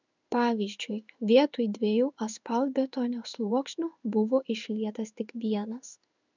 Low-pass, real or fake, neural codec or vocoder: 7.2 kHz; fake; codec, 16 kHz in and 24 kHz out, 1 kbps, XY-Tokenizer